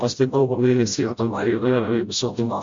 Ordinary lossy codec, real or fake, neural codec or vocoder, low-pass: MP3, 48 kbps; fake; codec, 16 kHz, 0.5 kbps, FreqCodec, smaller model; 7.2 kHz